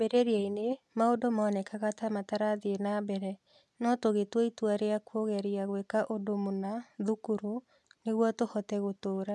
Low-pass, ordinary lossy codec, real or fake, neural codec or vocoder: none; none; real; none